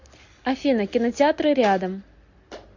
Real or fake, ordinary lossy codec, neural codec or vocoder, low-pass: real; MP3, 48 kbps; none; 7.2 kHz